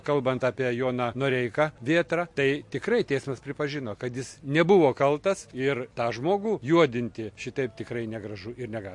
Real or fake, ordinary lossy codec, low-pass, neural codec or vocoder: real; MP3, 48 kbps; 10.8 kHz; none